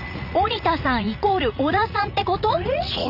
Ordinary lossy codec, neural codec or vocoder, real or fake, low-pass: none; vocoder, 44.1 kHz, 80 mel bands, Vocos; fake; 5.4 kHz